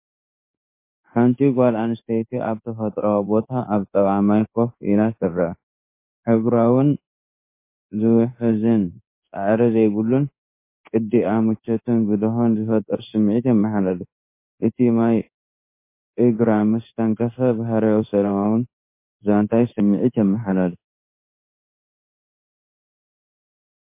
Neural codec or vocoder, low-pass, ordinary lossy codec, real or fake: codec, 16 kHz in and 24 kHz out, 1 kbps, XY-Tokenizer; 3.6 kHz; MP3, 24 kbps; fake